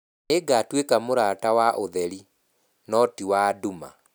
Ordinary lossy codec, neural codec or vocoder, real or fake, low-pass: none; none; real; none